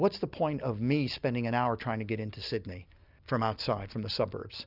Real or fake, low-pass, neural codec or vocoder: real; 5.4 kHz; none